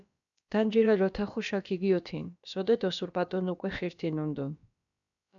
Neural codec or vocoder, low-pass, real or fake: codec, 16 kHz, about 1 kbps, DyCAST, with the encoder's durations; 7.2 kHz; fake